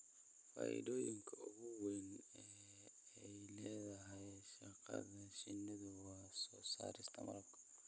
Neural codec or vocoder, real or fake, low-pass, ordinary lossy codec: none; real; none; none